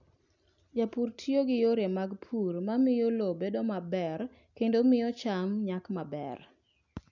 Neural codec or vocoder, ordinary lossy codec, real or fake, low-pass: none; none; real; 7.2 kHz